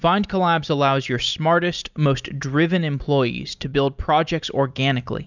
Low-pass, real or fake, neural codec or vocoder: 7.2 kHz; real; none